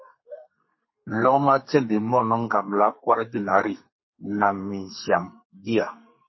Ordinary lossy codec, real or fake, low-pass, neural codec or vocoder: MP3, 24 kbps; fake; 7.2 kHz; codec, 32 kHz, 1.9 kbps, SNAC